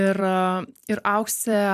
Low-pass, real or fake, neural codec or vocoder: 14.4 kHz; fake; vocoder, 44.1 kHz, 128 mel bands every 256 samples, BigVGAN v2